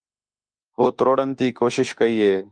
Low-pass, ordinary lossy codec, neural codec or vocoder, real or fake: 9.9 kHz; Opus, 32 kbps; autoencoder, 48 kHz, 32 numbers a frame, DAC-VAE, trained on Japanese speech; fake